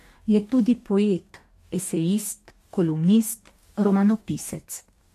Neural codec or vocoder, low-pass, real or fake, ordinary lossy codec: codec, 44.1 kHz, 2.6 kbps, DAC; 14.4 kHz; fake; MP3, 64 kbps